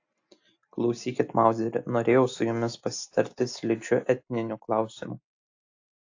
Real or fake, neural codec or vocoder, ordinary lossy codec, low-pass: real; none; AAC, 48 kbps; 7.2 kHz